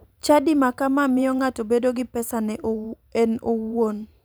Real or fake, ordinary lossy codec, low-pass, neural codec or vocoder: fake; none; none; vocoder, 44.1 kHz, 128 mel bands every 512 samples, BigVGAN v2